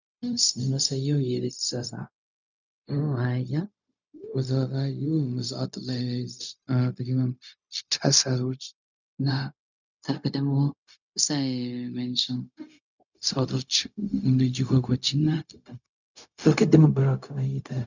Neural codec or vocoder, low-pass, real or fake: codec, 16 kHz, 0.4 kbps, LongCat-Audio-Codec; 7.2 kHz; fake